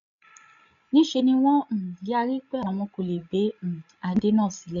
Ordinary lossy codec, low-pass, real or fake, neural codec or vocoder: none; 7.2 kHz; fake; codec, 16 kHz, 16 kbps, FreqCodec, larger model